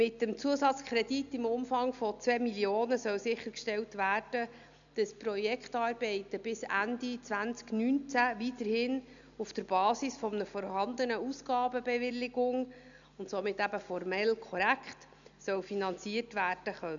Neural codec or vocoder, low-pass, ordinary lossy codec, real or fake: none; 7.2 kHz; none; real